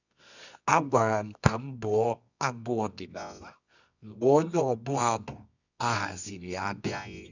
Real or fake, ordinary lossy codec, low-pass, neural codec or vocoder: fake; none; 7.2 kHz; codec, 24 kHz, 0.9 kbps, WavTokenizer, medium music audio release